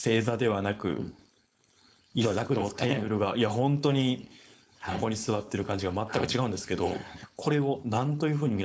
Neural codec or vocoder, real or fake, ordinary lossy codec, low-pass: codec, 16 kHz, 4.8 kbps, FACodec; fake; none; none